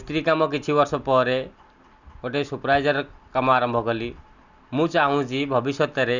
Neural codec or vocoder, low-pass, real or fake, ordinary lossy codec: none; 7.2 kHz; real; none